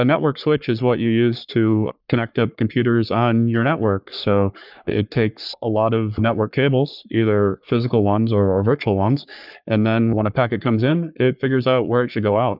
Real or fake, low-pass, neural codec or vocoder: fake; 5.4 kHz; codec, 44.1 kHz, 3.4 kbps, Pupu-Codec